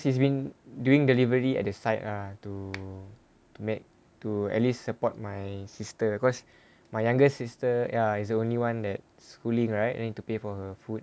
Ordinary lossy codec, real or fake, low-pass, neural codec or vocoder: none; real; none; none